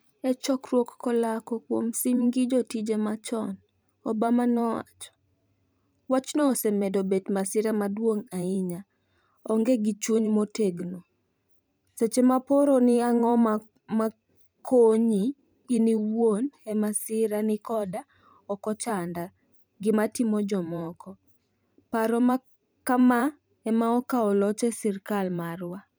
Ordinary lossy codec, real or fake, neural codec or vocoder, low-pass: none; fake; vocoder, 44.1 kHz, 128 mel bands every 512 samples, BigVGAN v2; none